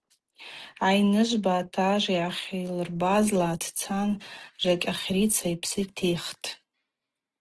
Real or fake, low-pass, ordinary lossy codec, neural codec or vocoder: real; 10.8 kHz; Opus, 16 kbps; none